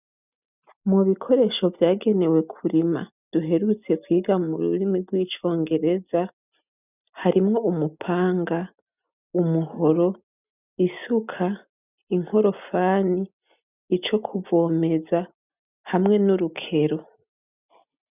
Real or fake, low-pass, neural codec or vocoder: real; 3.6 kHz; none